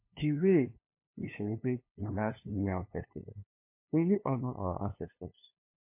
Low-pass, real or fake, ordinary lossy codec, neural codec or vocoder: 3.6 kHz; fake; MP3, 24 kbps; codec, 16 kHz, 2 kbps, FunCodec, trained on LibriTTS, 25 frames a second